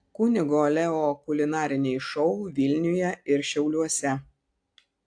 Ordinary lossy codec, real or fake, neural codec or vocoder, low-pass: AAC, 64 kbps; real; none; 9.9 kHz